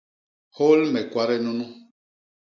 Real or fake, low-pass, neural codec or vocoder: real; 7.2 kHz; none